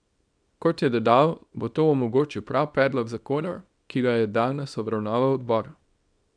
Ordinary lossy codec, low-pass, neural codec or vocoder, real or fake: none; 9.9 kHz; codec, 24 kHz, 0.9 kbps, WavTokenizer, small release; fake